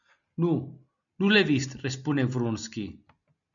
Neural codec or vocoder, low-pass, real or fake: none; 7.2 kHz; real